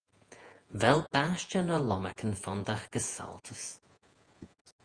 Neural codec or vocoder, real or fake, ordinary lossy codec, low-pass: vocoder, 48 kHz, 128 mel bands, Vocos; fake; Opus, 32 kbps; 9.9 kHz